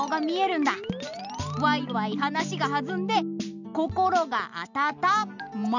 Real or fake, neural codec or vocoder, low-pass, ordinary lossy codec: real; none; 7.2 kHz; none